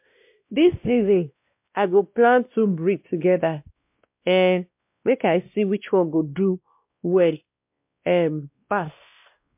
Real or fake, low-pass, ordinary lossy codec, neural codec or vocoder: fake; 3.6 kHz; MP3, 32 kbps; codec, 16 kHz, 1 kbps, X-Codec, WavLM features, trained on Multilingual LibriSpeech